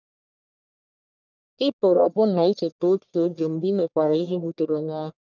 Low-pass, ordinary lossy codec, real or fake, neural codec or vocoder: 7.2 kHz; none; fake; codec, 44.1 kHz, 1.7 kbps, Pupu-Codec